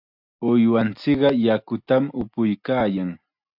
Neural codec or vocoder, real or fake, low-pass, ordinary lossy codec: none; real; 5.4 kHz; AAC, 48 kbps